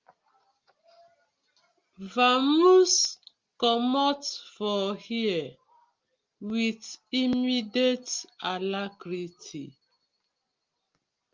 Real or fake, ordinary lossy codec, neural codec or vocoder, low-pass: real; Opus, 32 kbps; none; 7.2 kHz